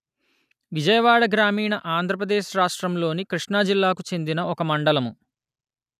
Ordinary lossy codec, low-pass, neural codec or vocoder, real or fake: none; 14.4 kHz; none; real